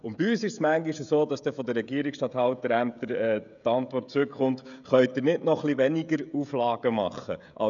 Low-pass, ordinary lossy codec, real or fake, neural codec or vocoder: 7.2 kHz; none; fake; codec, 16 kHz, 16 kbps, FreqCodec, smaller model